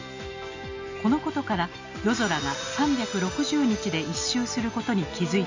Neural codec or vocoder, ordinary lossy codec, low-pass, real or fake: none; AAC, 48 kbps; 7.2 kHz; real